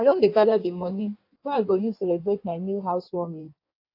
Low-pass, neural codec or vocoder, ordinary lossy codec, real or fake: 5.4 kHz; codec, 16 kHz in and 24 kHz out, 1.1 kbps, FireRedTTS-2 codec; AAC, 32 kbps; fake